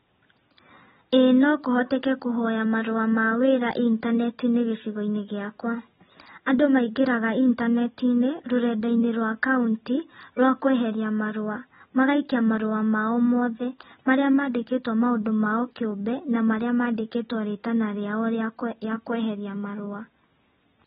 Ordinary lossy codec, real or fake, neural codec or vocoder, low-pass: AAC, 16 kbps; real; none; 14.4 kHz